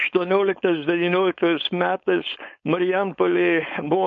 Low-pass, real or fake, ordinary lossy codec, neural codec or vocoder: 7.2 kHz; fake; MP3, 48 kbps; codec, 16 kHz, 4.8 kbps, FACodec